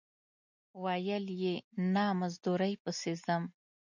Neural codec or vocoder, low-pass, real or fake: none; 7.2 kHz; real